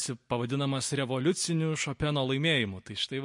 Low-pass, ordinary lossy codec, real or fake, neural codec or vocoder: 10.8 kHz; MP3, 48 kbps; real; none